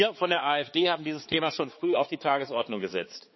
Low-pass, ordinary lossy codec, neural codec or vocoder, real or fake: 7.2 kHz; MP3, 24 kbps; codec, 16 kHz, 4 kbps, X-Codec, HuBERT features, trained on balanced general audio; fake